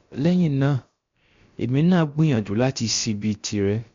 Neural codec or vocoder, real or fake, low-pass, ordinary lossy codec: codec, 16 kHz, about 1 kbps, DyCAST, with the encoder's durations; fake; 7.2 kHz; MP3, 48 kbps